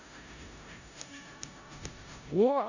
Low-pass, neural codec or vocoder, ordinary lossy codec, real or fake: 7.2 kHz; codec, 16 kHz in and 24 kHz out, 0.4 kbps, LongCat-Audio-Codec, four codebook decoder; none; fake